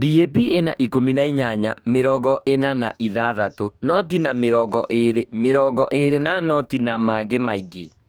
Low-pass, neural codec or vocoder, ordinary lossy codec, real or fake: none; codec, 44.1 kHz, 2.6 kbps, DAC; none; fake